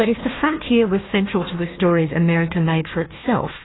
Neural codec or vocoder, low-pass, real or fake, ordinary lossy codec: codec, 16 kHz, 1 kbps, FunCodec, trained on Chinese and English, 50 frames a second; 7.2 kHz; fake; AAC, 16 kbps